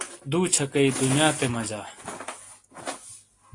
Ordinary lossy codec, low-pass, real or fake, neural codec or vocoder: AAC, 48 kbps; 10.8 kHz; real; none